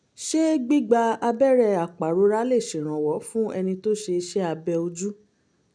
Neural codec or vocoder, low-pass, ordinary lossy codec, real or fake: none; 9.9 kHz; none; real